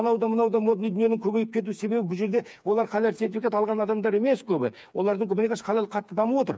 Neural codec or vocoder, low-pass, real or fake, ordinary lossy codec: codec, 16 kHz, 4 kbps, FreqCodec, smaller model; none; fake; none